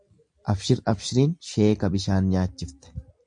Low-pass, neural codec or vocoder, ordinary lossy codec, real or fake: 9.9 kHz; none; MP3, 64 kbps; real